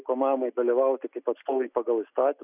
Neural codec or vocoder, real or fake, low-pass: none; real; 3.6 kHz